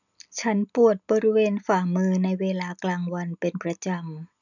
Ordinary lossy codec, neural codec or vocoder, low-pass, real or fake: none; none; 7.2 kHz; real